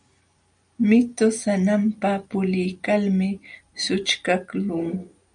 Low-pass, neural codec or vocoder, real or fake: 9.9 kHz; none; real